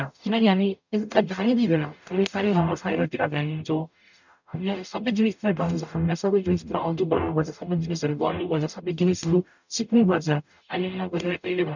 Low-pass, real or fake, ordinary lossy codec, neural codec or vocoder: 7.2 kHz; fake; none; codec, 44.1 kHz, 0.9 kbps, DAC